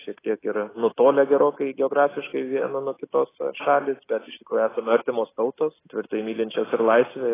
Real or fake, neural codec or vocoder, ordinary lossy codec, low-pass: real; none; AAC, 16 kbps; 3.6 kHz